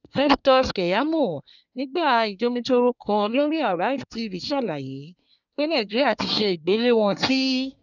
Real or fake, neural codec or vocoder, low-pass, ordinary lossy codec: fake; codec, 24 kHz, 1 kbps, SNAC; 7.2 kHz; none